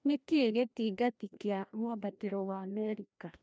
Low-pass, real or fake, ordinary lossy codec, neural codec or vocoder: none; fake; none; codec, 16 kHz, 1 kbps, FreqCodec, larger model